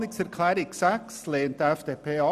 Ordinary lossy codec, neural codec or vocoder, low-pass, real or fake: none; none; 14.4 kHz; real